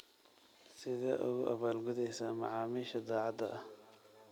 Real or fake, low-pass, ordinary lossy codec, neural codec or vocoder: real; 19.8 kHz; none; none